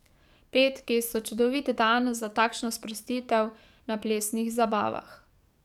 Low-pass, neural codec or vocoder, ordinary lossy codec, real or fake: 19.8 kHz; codec, 44.1 kHz, 7.8 kbps, DAC; none; fake